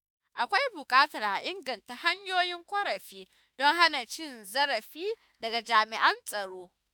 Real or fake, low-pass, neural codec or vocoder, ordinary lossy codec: fake; none; autoencoder, 48 kHz, 32 numbers a frame, DAC-VAE, trained on Japanese speech; none